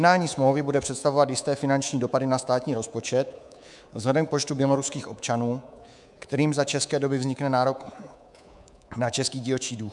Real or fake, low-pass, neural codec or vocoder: fake; 10.8 kHz; codec, 24 kHz, 3.1 kbps, DualCodec